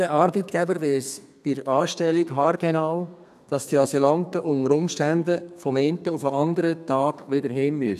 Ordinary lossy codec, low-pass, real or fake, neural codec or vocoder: none; 14.4 kHz; fake; codec, 32 kHz, 1.9 kbps, SNAC